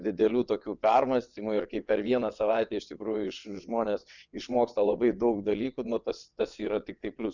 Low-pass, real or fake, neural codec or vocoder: 7.2 kHz; fake; vocoder, 22.05 kHz, 80 mel bands, WaveNeXt